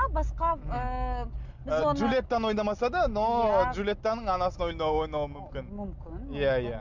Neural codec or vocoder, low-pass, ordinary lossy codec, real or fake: none; 7.2 kHz; none; real